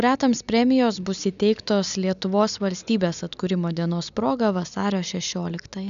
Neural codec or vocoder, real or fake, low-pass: none; real; 7.2 kHz